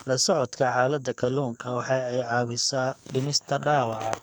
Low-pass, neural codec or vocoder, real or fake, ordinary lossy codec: none; codec, 44.1 kHz, 2.6 kbps, SNAC; fake; none